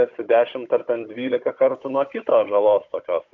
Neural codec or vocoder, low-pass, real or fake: codec, 16 kHz, 16 kbps, FunCodec, trained on Chinese and English, 50 frames a second; 7.2 kHz; fake